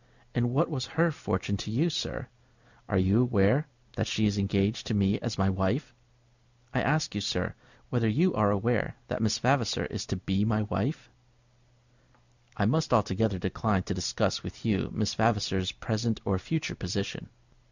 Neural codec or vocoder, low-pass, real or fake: none; 7.2 kHz; real